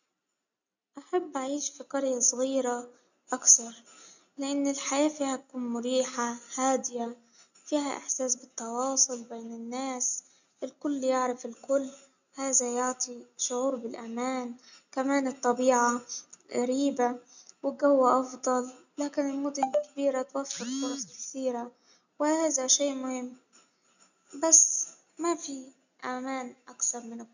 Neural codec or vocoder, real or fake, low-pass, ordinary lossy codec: none; real; 7.2 kHz; none